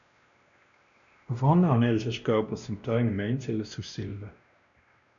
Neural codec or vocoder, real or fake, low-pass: codec, 16 kHz, 1 kbps, X-Codec, WavLM features, trained on Multilingual LibriSpeech; fake; 7.2 kHz